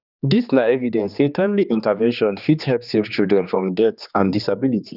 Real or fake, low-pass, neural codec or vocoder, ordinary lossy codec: fake; 5.4 kHz; codec, 16 kHz, 2 kbps, X-Codec, HuBERT features, trained on general audio; none